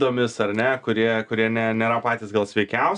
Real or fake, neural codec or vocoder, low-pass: real; none; 9.9 kHz